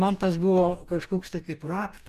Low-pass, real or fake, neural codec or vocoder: 14.4 kHz; fake; codec, 44.1 kHz, 2.6 kbps, DAC